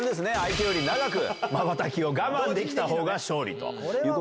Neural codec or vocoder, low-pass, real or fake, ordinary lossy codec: none; none; real; none